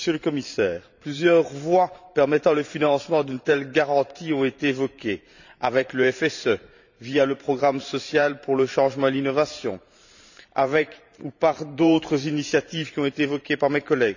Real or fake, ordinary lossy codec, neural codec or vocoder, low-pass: fake; none; vocoder, 44.1 kHz, 128 mel bands every 512 samples, BigVGAN v2; 7.2 kHz